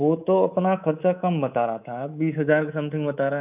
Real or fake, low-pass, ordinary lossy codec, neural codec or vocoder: fake; 3.6 kHz; none; codec, 24 kHz, 3.1 kbps, DualCodec